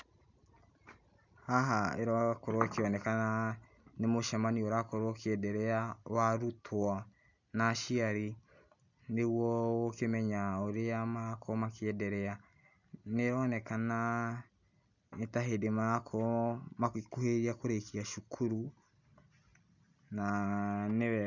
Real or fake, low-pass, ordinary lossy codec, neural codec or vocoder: real; 7.2 kHz; none; none